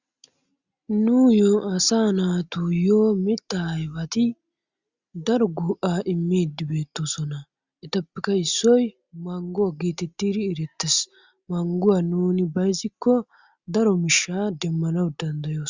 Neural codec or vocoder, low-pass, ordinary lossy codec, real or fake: none; 7.2 kHz; Opus, 64 kbps; real